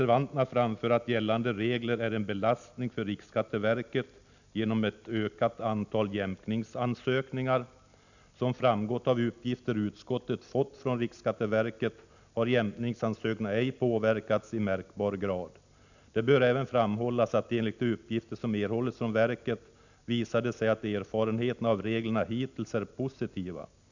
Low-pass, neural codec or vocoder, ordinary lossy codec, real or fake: 7.2 kHz; none; none; real